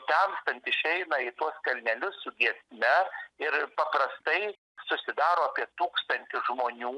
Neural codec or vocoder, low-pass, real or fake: none; 10.8 kHz; real